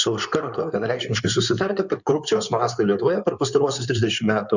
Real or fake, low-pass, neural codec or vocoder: fake; 7.2 kHz; codec, 16 kHz in and 24 kHz out, 2.2 kbps, FireRedTTS-2 codec